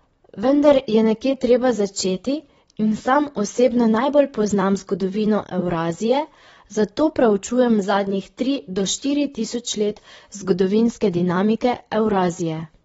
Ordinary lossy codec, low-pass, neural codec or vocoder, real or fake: AAC, 24 kbps; 19.8 kHz; vocoder, 44.1 kHz, 128 mel bands, Pupu-Vocoder; fake